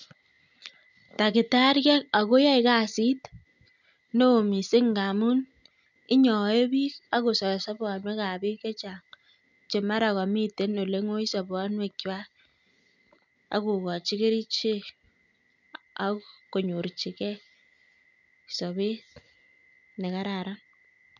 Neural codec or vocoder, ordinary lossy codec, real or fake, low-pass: none; none; real; 7.2 kHz